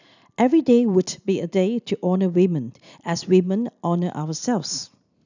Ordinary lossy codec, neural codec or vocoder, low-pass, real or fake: none; none; 7.2 kHz; real